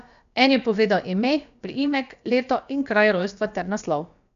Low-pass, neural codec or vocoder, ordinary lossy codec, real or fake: 7.2 kHz; codec, 16 kHz, about 1 kbps, DyCAST, with the encoder's durations; none; fake